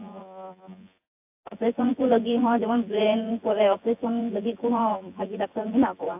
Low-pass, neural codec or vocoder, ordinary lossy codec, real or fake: 3.6 kHz; vocoder, 24 kHz, 100 mel bands, Vocos; AAC, 32 kbps; fake